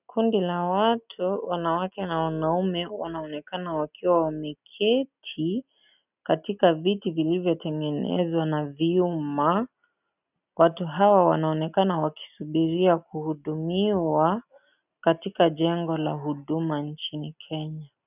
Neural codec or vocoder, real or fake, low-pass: none; real; 3.6 kHz